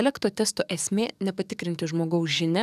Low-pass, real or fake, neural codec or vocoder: 14.4 kHz; fake; codec, 44.1 kHz, 7.8 kbps, DAC